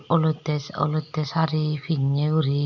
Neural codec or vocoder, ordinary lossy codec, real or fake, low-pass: none; none; real; 7.2 kHz